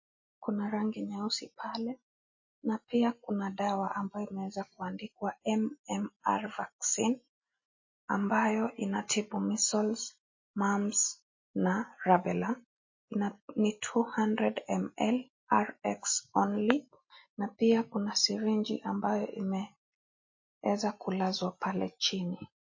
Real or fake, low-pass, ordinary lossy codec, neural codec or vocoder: real; 7.2 kHz; MP3, 32 kbps; none